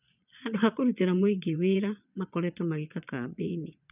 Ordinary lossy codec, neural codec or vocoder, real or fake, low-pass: none; vocoder, 22.05 kHz, 80 mel bands, Vocos; fake; 3.6 kHz